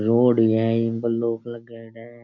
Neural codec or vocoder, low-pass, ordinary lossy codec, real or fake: none; 7.2 kHz; none; real